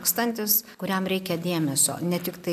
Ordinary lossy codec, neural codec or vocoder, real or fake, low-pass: AAC, 96 kbps; none; real; 14.4 kHz